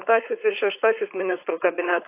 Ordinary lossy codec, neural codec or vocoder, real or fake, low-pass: AAC, 24 kbps; codec, 16 kHz, 4.8 kbps, FACodec; fake; 3.6 kHz